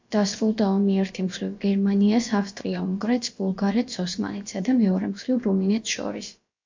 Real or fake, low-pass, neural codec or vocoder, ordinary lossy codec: fake; 7.2 kHz; codec, 16 kHz, about 1 kbps, DyCAST, with the encoder's durations; MP3, 48 kbps